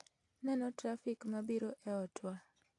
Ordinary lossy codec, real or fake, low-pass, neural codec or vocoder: AAC, 48 kbps; real; 10.8 kHz; none